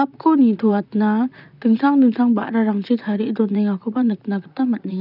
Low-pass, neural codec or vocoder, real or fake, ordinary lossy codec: 5.4 kHz; vocoder, 44.1 kHz, 128 mel bands, Pupu-Vocoder; fake; none